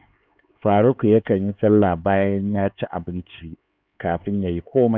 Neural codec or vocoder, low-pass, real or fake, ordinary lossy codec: codec, 16 kHz, 4 kbps, X-Codec, HuBERT features, trained on LibriSpeech; none; fake; none